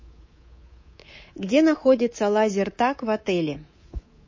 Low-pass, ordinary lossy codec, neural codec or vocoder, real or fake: 7.2 kHz; MP3, 32 kbps; codec, 24 kHz, 3.1 kbps, DualCodec; fake